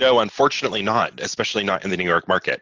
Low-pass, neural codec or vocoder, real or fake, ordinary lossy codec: 7.2 kHz; none; real; Opus, 32 kbps